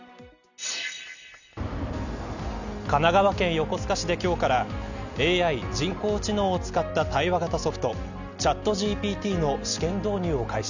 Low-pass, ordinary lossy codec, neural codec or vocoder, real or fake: 7.2 kHz; none; none; real